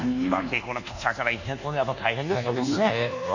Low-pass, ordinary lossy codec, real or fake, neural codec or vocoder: 7.2 kHz; none; fake; codec, 24 kHz, 1.2 kbps, DualCodec